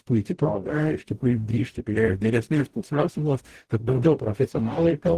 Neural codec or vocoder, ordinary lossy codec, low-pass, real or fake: codec, 44.1 kHz, 0.9 kbps, DAC; Opus, 16 kbps; 14.4 kHz; fake